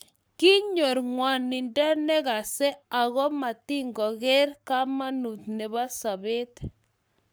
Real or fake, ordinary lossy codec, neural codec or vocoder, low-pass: fake; none; codec, 44.1 kHz, 7.8 kbps, Pupu-Codec; none